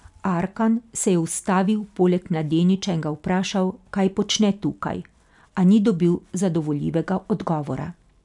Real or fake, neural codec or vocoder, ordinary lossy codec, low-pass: real; none; none; 10.8 kHz